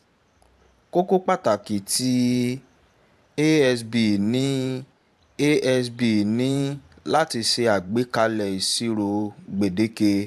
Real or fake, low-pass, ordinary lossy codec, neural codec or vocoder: fake; 14.4 kHz; AAC, 96 kbps; vocoder, 44.1 kHz, 128 mel bands every 512 samples, BigVGAN v2